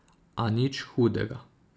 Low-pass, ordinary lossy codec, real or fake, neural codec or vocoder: none; none; real; none